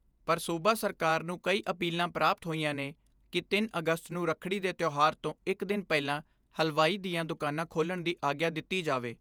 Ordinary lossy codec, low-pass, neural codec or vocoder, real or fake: none; none; vocoder, 48 kHz, 128 mel bands, Vocos; fake